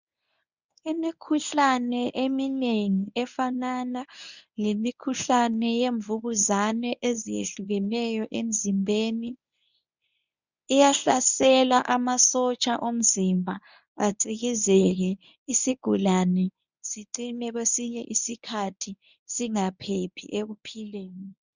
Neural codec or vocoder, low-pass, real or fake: codec, 24 kHz, 0.9 kbps, WavTokenizer, medium speech release version 1; 7.2 kHz; fake